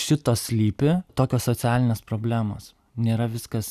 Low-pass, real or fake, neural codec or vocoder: 14.4 kHz; real; none